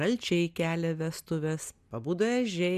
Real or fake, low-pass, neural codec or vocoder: real; 14.4 kHz; none